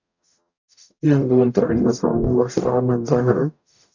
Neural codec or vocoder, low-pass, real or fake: codec, 44.1 kHz, 0.9 kbps, DAC; 7.2 kHz; fake